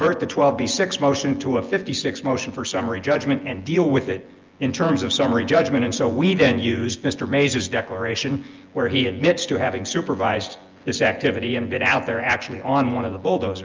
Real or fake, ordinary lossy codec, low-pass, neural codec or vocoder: fake; Opus, 24 kbps; 7.2 kHz; vocoder, 24 kHz, 100 mel bands, Vocos